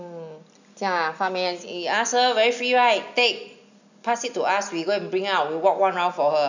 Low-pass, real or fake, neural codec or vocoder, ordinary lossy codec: 7.2 kHz; real; none; none